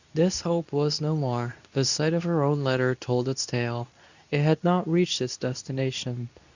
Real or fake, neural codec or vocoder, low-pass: fake; codec, 24 kHz, 0.9 kbps, WavTokenizer, medium speech release version 2; 7.2 kHz